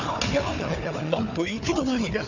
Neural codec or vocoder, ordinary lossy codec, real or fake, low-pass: codec, 16 kHz, 4 kbps, FunCodec, trained on Chinese and English, 50 frames a second; none; fake; 7.2 kHz